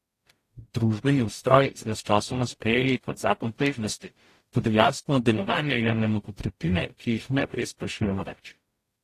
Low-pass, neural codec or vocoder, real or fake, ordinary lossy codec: 14.4 kHz; codec, 44.1 kHz, 0.9 kbps, DAC; fake; AAC, 48 kbps